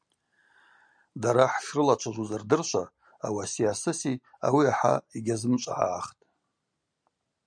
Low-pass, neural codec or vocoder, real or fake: 9.9 kHz; none; real